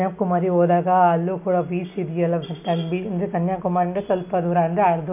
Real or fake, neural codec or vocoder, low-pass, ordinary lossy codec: real; none; 3.6 kHz; none